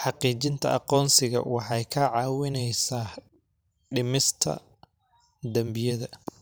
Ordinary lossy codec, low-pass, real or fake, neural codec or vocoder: none; none; fake; vocoder, 44.1 kHz, 128 mel bands every 512 samples, BigVGAN v2